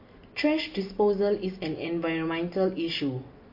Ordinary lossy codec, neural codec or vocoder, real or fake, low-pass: MP3, 32 kbps; none; real; 5.4 kHz